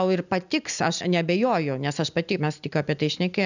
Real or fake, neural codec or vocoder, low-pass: real; none; 7.2 kHz